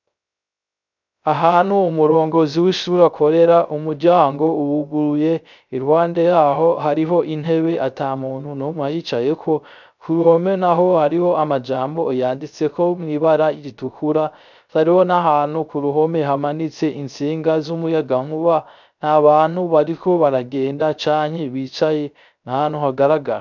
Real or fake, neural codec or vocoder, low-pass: fake; codec, 16 kHz, 0.3 kbps, FocalCodec; 7.2 kHz